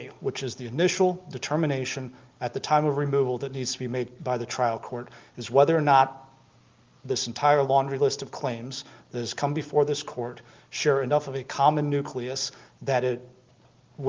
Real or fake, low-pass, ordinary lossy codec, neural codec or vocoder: real; 7.2 kHz; Opus, 32 kbps; none